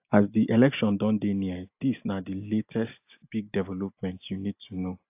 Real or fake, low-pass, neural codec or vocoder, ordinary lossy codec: real; 3.6 kHz; none; AAC, 32 kbps